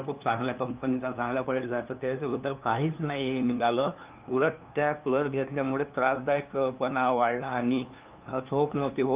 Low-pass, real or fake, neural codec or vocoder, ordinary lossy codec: 3.6 kHz; fake; codec, 16 kHz, 2 kbps, FunCodec, trained on LibriTTS, 25 frames a second; Opus, 32 kbps